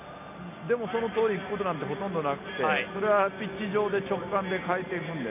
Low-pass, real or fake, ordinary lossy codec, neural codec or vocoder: 3.6 kHz; real; none; none